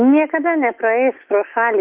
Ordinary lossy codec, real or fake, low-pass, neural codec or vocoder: Opus, 32 kbps; fake; 3.6 kHz; autoencoder, 48 kHz, 128 numbers a frame, DAC-VAE, trained on Japanese speech